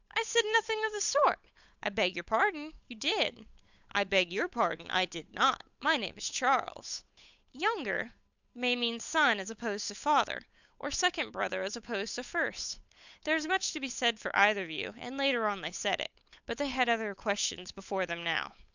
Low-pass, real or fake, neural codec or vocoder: 7.2 kHz; fake; codec, 16 kHz, 8 kbps, FunCodec, trained on Chinese and English, 25 frames a second